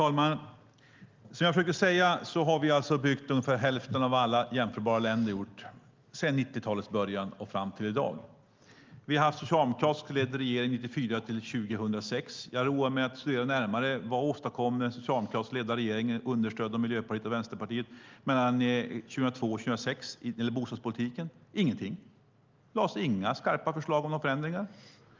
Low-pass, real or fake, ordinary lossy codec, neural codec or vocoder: 7.2 kHz; real; Opus, 24 kbps; none